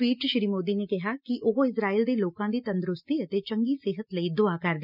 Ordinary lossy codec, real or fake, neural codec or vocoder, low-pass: none; real; none; 5.4 kHz